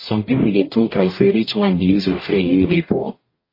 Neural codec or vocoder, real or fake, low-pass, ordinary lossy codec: codec, 44.1 kHz, 0.9 kbps, DAC; fake; 5.4 kHz; MP3, 24 kbps